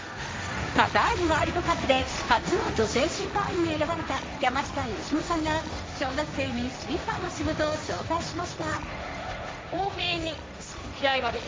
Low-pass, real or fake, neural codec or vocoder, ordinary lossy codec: none; fake; codec, 16 kHz, 1.1 kbps, Voila-Tokenizer; none